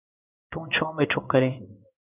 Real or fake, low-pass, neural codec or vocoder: fake; 3.6 kHz; codec, 16 kHz in and 24 kHz out, 1 kbps, XY-Tokenizer